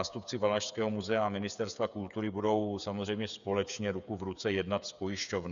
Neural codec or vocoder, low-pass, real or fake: codec, 16 kHz, 8 kbps, FreqCodec, smaller model; 7.2 kHz; fake